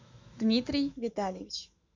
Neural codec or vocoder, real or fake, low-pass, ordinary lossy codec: autoencoder, 48 kHz, 128 numbers a frame, DAC-VAE, trained on Japanese speech; fake; 7.2 kHz; MP3, 64 kbps